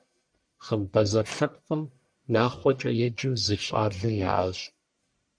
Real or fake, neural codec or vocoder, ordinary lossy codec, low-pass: fake; codec, 44.1 kHz, 1.7 kbps, Pupu-Codec; MP3, 96 kbps; 9.9 kHz